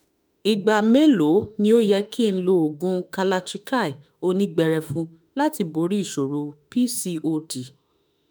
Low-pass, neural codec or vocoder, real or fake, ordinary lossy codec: none; autoencoder, 48 kHz, 32 numbers a frame, DAC-VAE, trained on Japanese speech; fake; none